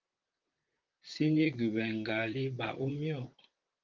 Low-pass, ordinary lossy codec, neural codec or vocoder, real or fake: 7.2 kHz; Opus, 32 kbps; vocoder, 44.1 kHz, 128 mel bands, Pupu-Vocoder; fake